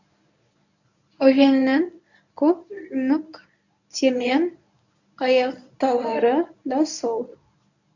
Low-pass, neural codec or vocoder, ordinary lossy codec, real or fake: 7.2 kHz; codec, 24 kHz, 0.9 kbps, WavTokenizer, medium speech release version 1; none; fake